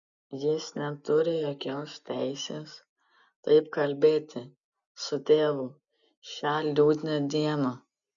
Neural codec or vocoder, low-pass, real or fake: none; 7.2 kHz; real